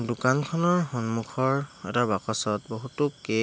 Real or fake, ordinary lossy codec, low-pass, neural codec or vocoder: real; none; none; none